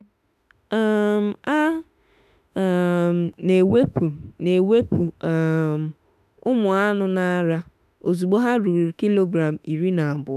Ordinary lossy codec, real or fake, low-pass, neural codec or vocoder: none; fake; 14.4 kHz; autoencoder, 48 kHz, 32 numbers a frame, DAC-VAE, trained on Japanese speech